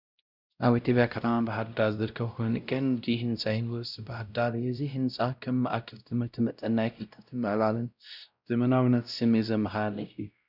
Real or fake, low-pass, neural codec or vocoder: fake; 5.4 kHz; codec, 16 kHz, 0.5 kbps, X-Codec, WavLM features, trained on Multilingual LibriSpeech